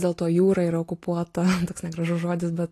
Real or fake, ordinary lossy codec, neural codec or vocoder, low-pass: real; AAC, 48 kbps; none; 14.4 kHz